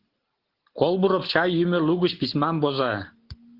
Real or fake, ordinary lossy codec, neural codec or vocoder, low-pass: real; Opus, 16 kbps; none; 5.4 kHz